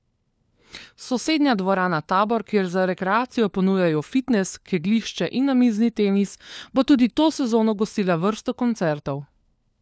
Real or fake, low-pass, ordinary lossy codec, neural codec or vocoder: fake; none; none; codec, 16 kHz, 4 kbps, FunCodec, trained on LibriTTS, 50 frames a second